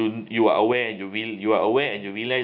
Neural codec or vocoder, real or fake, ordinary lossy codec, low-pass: none; real; none; 5.4 kHz